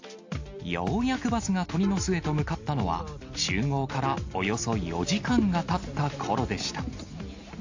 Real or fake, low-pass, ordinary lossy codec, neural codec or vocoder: real; 7.2 kHz; AAC, 48 kbps; none